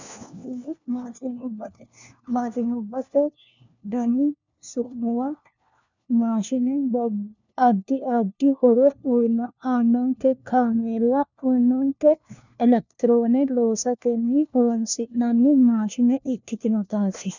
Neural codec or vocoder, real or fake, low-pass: codec, 16 kHz, 1 kbps, FunCodec, trained on LibriTTS, 50 frames a second; fake; 7.2 kHz